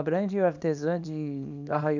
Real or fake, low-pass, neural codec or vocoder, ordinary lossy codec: fake; 7.2 kHz; codec, 24 kHz, 0.9 kbps, WavTokenizer, small release; none